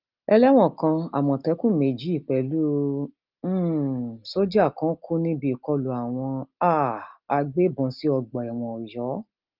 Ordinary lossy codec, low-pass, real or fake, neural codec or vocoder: Opus, 24 kbps; 5.4 kHz; real; none